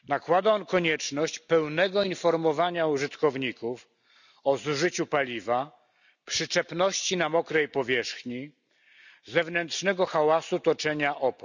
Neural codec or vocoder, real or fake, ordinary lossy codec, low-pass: none; real; none; 7.2 kHz